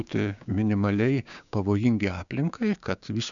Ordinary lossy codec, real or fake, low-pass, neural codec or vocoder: MP3, 96 kbps; fake; 7.2 kHz; codec, 16 kHz, 6 kbps, DAC